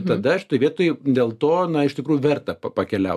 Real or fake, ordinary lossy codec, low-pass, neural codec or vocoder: real; AAC, 96 kbps; 14.4 kHz; none